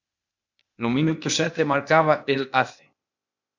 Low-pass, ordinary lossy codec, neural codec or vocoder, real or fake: 7.2 kHz; MP3, 64 kbps; codec, 16 kHz, 0.8 kbps, ZipCodec; fake